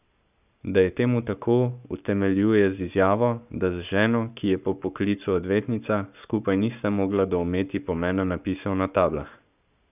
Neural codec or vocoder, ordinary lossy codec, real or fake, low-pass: codec, 44.1 kHz, 7.8 kbps, Pupu-Codec; none; fake; 3.6 kHz